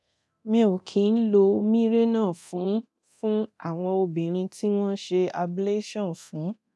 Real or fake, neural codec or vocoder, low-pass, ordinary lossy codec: fake; codec, 24 kHz, 0.9 kbps, DualCodec; none; none